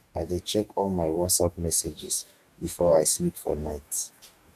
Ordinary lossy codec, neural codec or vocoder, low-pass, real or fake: none; codec, 44.1 kHz, 2.6 kbps, DAC; 14.4 kHz; fake